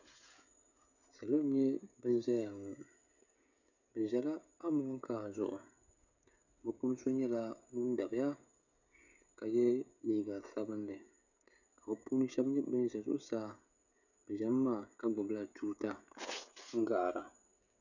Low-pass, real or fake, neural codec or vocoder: 7.2 kHz; fake; codec, 16 kHz, 16 kbps, FreqCodec, smaller model